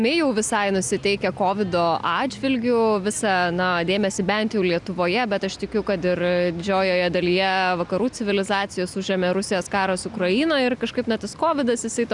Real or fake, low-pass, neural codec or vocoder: real; 10.8 kHz; none